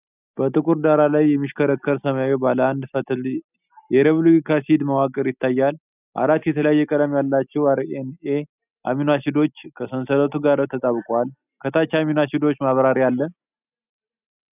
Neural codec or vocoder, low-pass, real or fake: none; 3.6 kHz; real